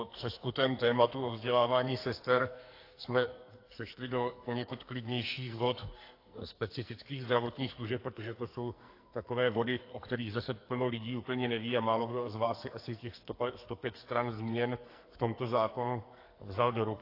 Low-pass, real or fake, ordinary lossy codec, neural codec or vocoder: 5.4 kHz; fake; AAC, 32 kbps; codec, 44.1 kHz, 2.6 kbps, SNAC